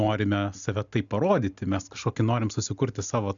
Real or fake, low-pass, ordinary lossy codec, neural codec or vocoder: real; 7.2 kHz; MP3, 96 kbps; none